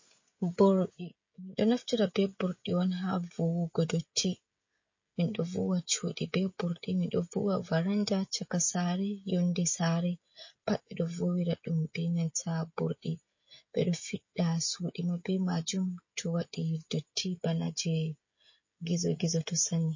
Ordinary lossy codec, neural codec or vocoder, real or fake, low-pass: MP3, 32 kbps; codec, 16 kHz, 16 kbps, FreqCodec, smaller model; fake; 7.2 kHz